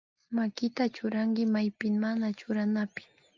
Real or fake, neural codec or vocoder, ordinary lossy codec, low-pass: real; none; Opus, 32 kbps; 7.2 kHz